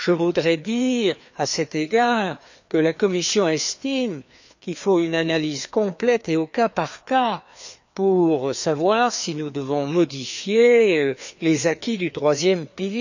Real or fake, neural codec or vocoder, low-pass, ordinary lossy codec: fake; codec, 16 kHz, 2 kbps, FreqCodec, larger model; 7.2 kHz; none